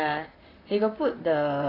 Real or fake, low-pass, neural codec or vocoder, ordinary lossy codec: fake; 5.4 kHz; vocoder, 44.1 kHz, 128 mel bands, Pupu-Vocoder; AAC, 24 kbps